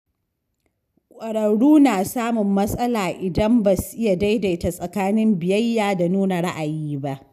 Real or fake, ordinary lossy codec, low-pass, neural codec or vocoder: real; none; 14.4 kHz; none